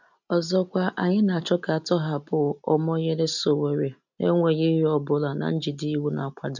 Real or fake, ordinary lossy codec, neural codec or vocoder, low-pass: real; none; none; 7.2 kHz